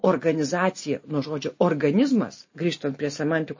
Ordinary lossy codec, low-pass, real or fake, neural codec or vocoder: MP3, 32 kbps; 7.2 kHz; real; none